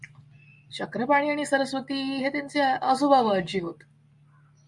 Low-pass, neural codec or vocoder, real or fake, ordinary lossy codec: 10.8 kHz; none; real; Opus, 64 kbps